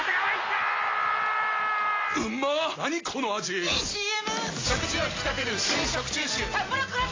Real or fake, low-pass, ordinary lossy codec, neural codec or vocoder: real; 7.2 kHz; AAC, 32 kbps; none